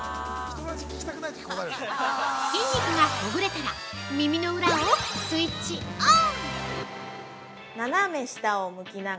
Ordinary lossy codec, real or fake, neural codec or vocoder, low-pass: none; real; none; none